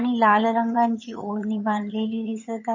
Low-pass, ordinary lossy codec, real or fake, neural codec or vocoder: 7.2 kHz; MP3, 32 kbps; fake; vocoder, 22.05 kHz, 80 mel bands, HiFi-GAN